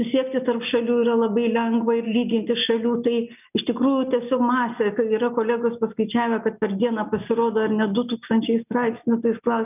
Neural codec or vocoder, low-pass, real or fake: none; 3.6 kHz; real